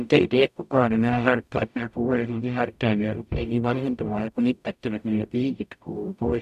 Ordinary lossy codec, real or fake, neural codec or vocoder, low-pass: none; fake; codec, 44.1 kHz, 0.9 kbps, DAC; 14.4 kHz